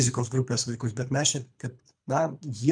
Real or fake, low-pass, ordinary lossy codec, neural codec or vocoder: fake; 9.9 kHz; MP3, 96 kbps; codec, 24 kHz, 3 kbps, HILCodec